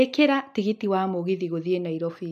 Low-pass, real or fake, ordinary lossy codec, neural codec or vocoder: 14.4 kHz; real; none; none